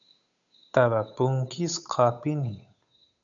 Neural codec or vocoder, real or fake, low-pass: codec, 16 kHz, 8 kbps, FunCodec, trained on Chinese and English, 25 frames a second; fake; 7.2 kHz